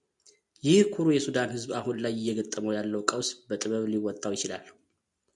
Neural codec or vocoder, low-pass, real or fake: none; 10.8 kHz; real